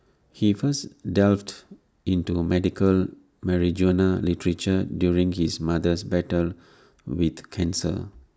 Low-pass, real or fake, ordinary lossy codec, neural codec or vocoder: none; real; none; none